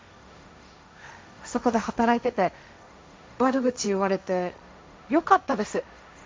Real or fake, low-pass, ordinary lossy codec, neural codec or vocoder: fake; none; none; codec, 16 kHz, 1.1 kbps, Voila-Tokenizer